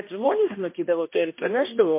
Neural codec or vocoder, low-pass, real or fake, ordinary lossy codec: codec, 16 kHz, 1 kbps, X-Codec, HuBERT features, trained on balanced general audio; 3.6 kHz; fake; MP3, 24 kbps